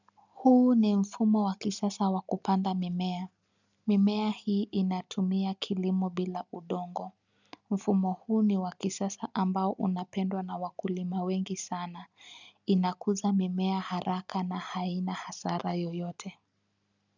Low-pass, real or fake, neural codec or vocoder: 7.2 kHz; real; none